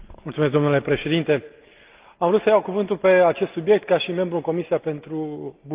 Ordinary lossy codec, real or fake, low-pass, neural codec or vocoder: Opus, 24 kbps; real; 3.6 kHz; none